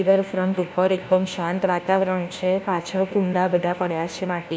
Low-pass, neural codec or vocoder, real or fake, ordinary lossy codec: none; codec, 16 kHz, 1 kbps, FunCodec, trained on LibriTTS, 50 frames a second; fake; none